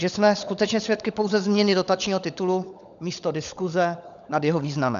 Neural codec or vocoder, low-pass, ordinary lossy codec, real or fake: codec, 16 kHz, 16 kbps, FunCodec, trained on LibriTTS, 50 frames a second; 7.2 kHz; MP3, 96 kbps; fake